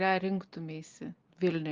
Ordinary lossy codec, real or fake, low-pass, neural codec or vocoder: Opus, 24 kbps; real; 7.2 kHz; none